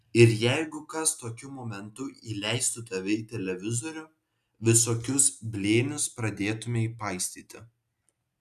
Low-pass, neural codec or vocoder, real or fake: 14.4 kHz; none; real